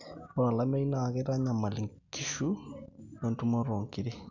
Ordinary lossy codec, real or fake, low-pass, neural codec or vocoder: none; real; 7.2 kHz; none